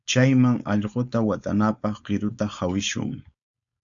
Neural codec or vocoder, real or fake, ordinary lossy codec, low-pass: codec, 16 kHz, 4.8 kbps, FACodec; fake; MP3, 96 kbps; 7.2 kHz